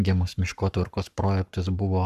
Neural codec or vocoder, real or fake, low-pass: codec, 44.1 kHz, 7.8 kbps, Pupu-Codec; fake; 14.4 kHz